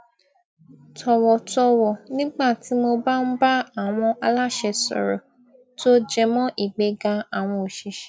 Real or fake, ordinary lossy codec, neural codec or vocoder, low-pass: real; none; none; none